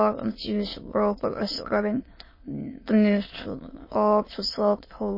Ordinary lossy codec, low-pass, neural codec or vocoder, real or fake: MP3, 24 kbps; 5.4 kHz; autoencoder, 22.05 kHz, a latent of 192 numbers a frame, VITS, trained on many speakers; fake